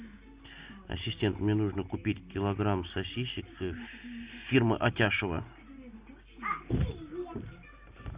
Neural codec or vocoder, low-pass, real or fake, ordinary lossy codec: none; 3.6 kHz; real; Opus, 64 kbps